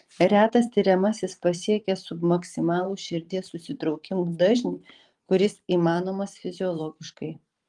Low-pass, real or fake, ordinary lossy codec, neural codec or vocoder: 10.8 kHz; fake; Opus, 24 kbps; vocoder, 44.1 kHz, 128 mel bands every 512 samples, BigVGAN v2